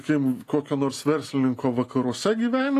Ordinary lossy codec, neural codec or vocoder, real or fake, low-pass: AAC, 48 kbps; none; real; 14.4 kHz